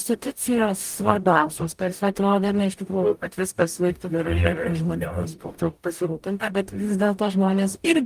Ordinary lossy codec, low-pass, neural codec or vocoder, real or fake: Opus, 32 kbps; 14.4 kHz; codec, 44.1 kHz, 0.9 kbps, DAC; fake